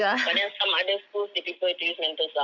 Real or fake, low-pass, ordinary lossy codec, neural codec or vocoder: real; none; none; none